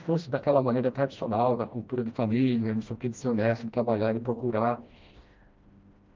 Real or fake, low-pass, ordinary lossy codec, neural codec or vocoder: fake; 7.2 kHz; Opus, 24 kbps; codec, 16 kHz, 1 kbps, FreqCodec, smaller model